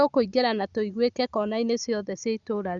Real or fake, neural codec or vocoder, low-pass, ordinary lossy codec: real; none; 7.2 kHz; Opus, 64 kbps